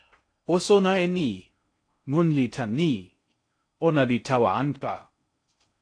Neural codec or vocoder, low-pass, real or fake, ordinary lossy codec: codec, 16 kHz in and 24 kHz out, 0.6 kbps, FocalCodec, streaming, 2048 codes; 9.9 kHz; fake; AAC, 48 kbps